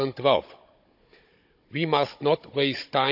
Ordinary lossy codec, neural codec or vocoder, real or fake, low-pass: AAC, 48 kbps; codec, 16 kHz, 16 kbps, FunCodec, trained on Chinese and English, 50 frames a second; fake; 5.4 kHz